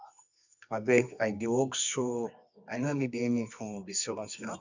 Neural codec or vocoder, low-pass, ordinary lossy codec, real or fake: codec, 24 kHz, 0.9 kbps, WavTokenizer, medium music audio release; 7.2 kHz; none; fake